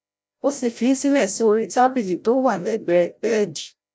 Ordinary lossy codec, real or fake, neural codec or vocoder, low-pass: none; fake; codec, 16 kHz, 0.5 kbps, FreqCodec, larger model; none